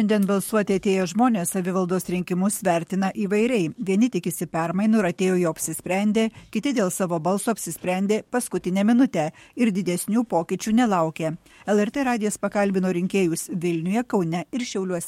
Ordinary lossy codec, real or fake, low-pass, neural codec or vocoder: MP3, 64 kbps; fake; 19.8 kHz; codec, 44.1 kHz, 7.8 kbps, DAC